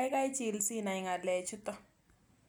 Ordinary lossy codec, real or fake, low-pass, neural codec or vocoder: none; real; none; none